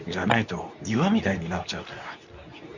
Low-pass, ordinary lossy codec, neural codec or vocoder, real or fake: 7.2 kHz; none; codec, 24 kHz, 0.9 kbps, WavTokenizer, medium speech release version 2; fake